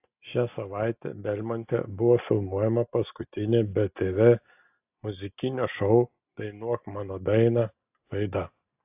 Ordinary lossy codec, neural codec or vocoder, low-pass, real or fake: AAC, 32 kbps; none; 3.6 kHz; real